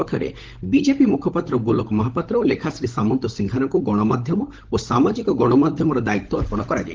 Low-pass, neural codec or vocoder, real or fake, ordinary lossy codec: 7.2 kHz; codec, 16 kHz, 16 kbps, FunCodec, trained on LibriTTS, 50 frames a second; fake; Opus, 16 kbps